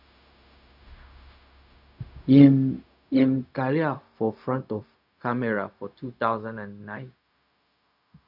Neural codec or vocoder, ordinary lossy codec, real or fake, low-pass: codec, 16 kHz, 0.4 kbps, LongCat-Audio-Codec; none; fake; 5.4 kHz